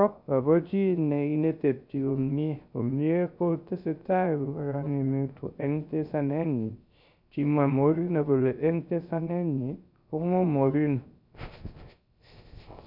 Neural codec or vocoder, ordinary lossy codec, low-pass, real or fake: codec, 16 kHz, 0.3 kbps, FocalCodec; none; 5.4 kHz; fake